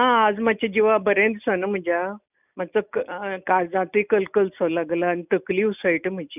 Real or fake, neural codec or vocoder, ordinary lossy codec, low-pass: real; none; none; 3.6 kHz